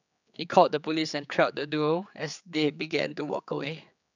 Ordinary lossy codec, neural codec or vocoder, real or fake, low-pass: none; codec, 16 kHz, 4 kbps, X-Codec, HuBERT features, trained on general audio; fake; 7.2 kHz